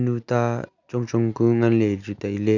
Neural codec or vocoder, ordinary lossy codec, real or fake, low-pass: none; none; real; 7.2 kHz